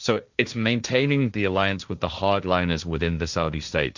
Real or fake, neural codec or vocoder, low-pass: fake; codec, 16 kHz, 1.1 kbps, Voila-Tokenizer; 7.2 kHz